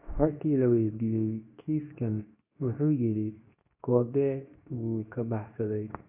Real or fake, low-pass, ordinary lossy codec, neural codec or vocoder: fake; 3.6 kHz; none; codec, 24 kHz, 0.9 kbps, WavTokenizer, medium speech release version 2